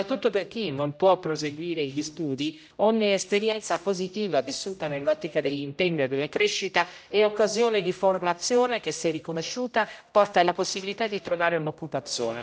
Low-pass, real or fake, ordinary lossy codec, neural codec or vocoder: none; fake; none; codec, 16 kHz, 0.5 kbps, X-Codec, HuBERT features, trained on general audio